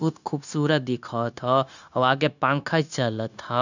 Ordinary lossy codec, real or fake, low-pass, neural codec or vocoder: none; fake; 7.2 kHz; codec, 16 kHz, 0.9 kbps, LongCat-Audio-Codec